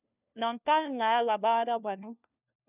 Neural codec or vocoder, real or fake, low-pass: codec, 16 kHz, 1 kbps, FunCodec, trained on LibriTTS, 50 frames a second; fake; 3.6 kHz